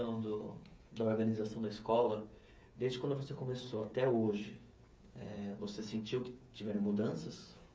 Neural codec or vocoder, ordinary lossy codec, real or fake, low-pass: codec, 16 kHz, 8 kbps, FreqCodec, smaller model; none; fake; none